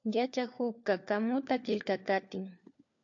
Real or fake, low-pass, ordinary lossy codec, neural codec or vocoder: fake; 7.2 kHz; AAC, 48 kbps; codec, 16 kHz, 4 kbps, FunCodec, trained on LibriTTS, 50 frames a second